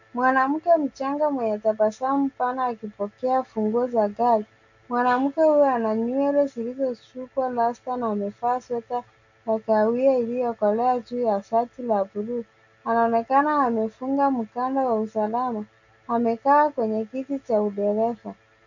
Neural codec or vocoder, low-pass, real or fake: none; 7.2 kHz; real